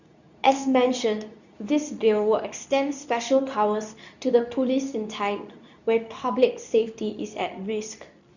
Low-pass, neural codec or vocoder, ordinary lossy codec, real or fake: 7.2 kHz; codec, 24 kHz, 0.9 kbps, WavTokenizer, medium speech release version 2; none; fake